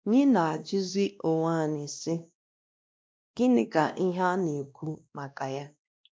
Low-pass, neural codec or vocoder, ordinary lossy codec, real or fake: none; codec, 16 kHz, 1 kbps, X-Codec, WavLM features, trained on Multilingual LibriSpeech; none; fake